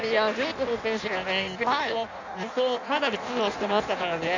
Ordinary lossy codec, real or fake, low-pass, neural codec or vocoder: none; fake; 7.2 kHz; codec, 16 kHz in and 24 kHz out, 0.6 kbps, FireRedTTS-2 codec